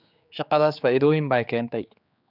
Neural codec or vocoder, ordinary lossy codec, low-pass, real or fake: codec, 16 kHz, 4 kbps, X-Codec, HuBERT features, trained on general audio; none; 5.4 kHz; fake